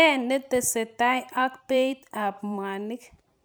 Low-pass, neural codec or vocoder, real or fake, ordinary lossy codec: none; vocoder, 44.1 kHz, 128 mel bands every 512 samples, BigVGAN v2; fake; none